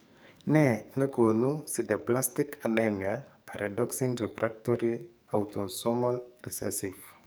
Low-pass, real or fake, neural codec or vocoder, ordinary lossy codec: none; fake; codec, 44.1 kHz, 2.6 kbps, SNAC; none